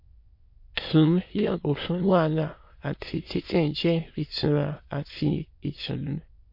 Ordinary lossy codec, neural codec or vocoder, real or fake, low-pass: MP3, 32 kbps; autoencoder, 22.05 kHz, a latent of 192 numbers a frame, VITS, trained on many speakers; fake; 5.4 kHz